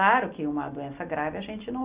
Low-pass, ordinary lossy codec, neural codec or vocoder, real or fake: 3.6 kHz; Opus, 64 kbps; none; real